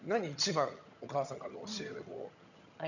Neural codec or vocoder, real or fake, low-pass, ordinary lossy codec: vocoder, 22.05 kHz, 80 mel bands, HiFi-GAN; fake; 7.2 kHz; none